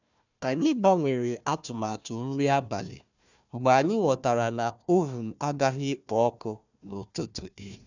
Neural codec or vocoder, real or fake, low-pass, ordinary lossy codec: codec, 16 kHz, 1 kbps, FunCodec, trained on Chinese and English, 50 frames a second; fake; 7.2 kHz; none